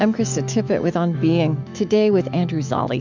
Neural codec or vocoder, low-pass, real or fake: autoencoder, 48 kHz, 128 numbers a frame, DAC-VAE, trained on Japanese speech; 7.2 kHz; fake